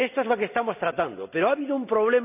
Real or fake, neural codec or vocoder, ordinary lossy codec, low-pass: real; none; none; 3.6 kHz